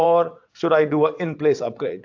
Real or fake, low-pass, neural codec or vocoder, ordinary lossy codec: fake; 7.2 kHz; vocoder, 22.05 kHz, 80 mel bands, WaveNeXt; AAC, 48 kbps